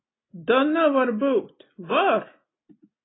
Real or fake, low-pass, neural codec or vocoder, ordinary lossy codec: real; 7.2 kHz; none; AAC, 16 kbps